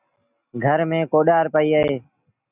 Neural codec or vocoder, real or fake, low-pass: none; real; 3.6 kHz